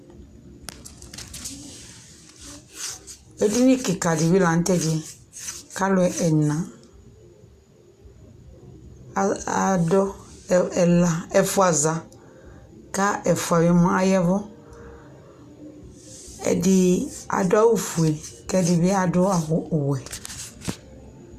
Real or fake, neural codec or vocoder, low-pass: real; none; 14.4 kHz